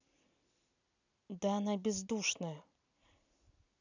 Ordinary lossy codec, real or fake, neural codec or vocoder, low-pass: none; real; none; 7.2 kHz